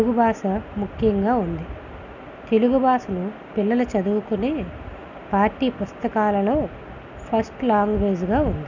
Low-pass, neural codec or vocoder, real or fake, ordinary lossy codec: 7.2 kHz; none; real; none